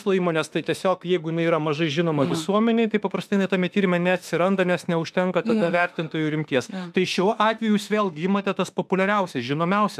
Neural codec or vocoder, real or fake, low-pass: autoencoder, 48 kHz, 32 numbers a frame, DAC-VAE, trained on Japanese speech; fake; 14.4 kHz